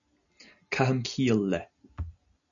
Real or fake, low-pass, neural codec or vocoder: real; 7.2 kHz; none